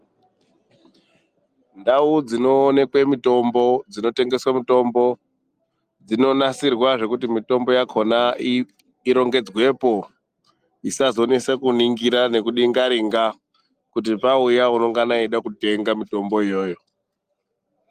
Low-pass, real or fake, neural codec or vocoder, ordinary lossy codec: 14.4 kHz; real; none; Opus, 32 kbps